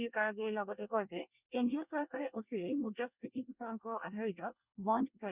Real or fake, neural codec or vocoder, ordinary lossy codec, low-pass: fake; codec, 24 kHz, 1 kbps, SNAC; Opus, 64 kbps; 3.6 kHz